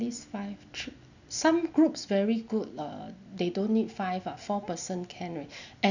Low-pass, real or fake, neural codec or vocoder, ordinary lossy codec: 7.2 kHz; real; none; none